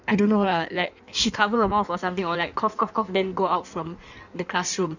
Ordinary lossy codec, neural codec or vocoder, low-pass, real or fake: none; codec, 16 kHz in and 24 kHz out, 1.1 kbps, FireRedTTS-2 codec; 7.2 kHz; fake